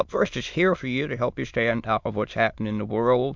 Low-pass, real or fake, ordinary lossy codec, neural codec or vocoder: 7.2 kHz; fake; MP3, 64 kbps; autoencoder, 22.05 kHz, a latent of 192 numbers a frame, VITS, trained on many speakers